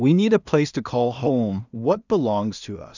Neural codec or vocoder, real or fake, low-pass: codec, 16 kHz in and 24 kHz out, 0.4 kbps, LongCat-Audio-Codec, two codebook decoder; fake; 7.2 kHz